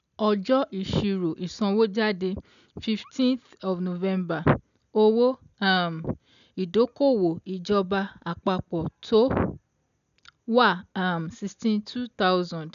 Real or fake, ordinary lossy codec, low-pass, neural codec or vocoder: real; none; 7.2 kHz; none